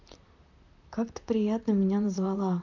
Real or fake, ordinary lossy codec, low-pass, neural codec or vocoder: fake; none; 7.2 kHz; vocoder, 44.1 kHz, 128 mel bands, Pupu-Vocoder